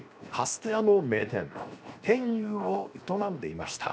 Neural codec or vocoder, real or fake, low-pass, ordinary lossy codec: codec, 16 kHz, 0.7 kbps, FocalCodec; fake; none; none